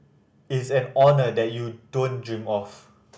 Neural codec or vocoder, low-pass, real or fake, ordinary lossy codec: none; none; real; none